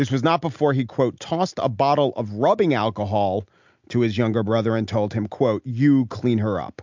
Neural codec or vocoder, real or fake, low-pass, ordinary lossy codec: none; real; 7.2 kHz; MP3, 64 kbps